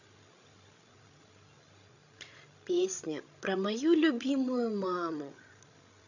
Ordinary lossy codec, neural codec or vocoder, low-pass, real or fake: none; codec, 16 kHz, 16 kbps, FreqCodec, larger model; 7.2 kHz; fake